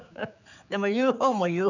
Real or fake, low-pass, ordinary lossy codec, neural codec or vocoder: fake; 7.2 kHz; none; codec, 16 kHz, 4 kbps, X-Codec, HuBERT features, trained on general audio